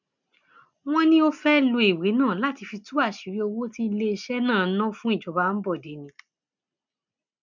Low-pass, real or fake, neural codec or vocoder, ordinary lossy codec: 7.2 kHz; real; none; none